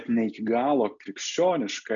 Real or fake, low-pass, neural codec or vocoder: real; 7.2 kHz; none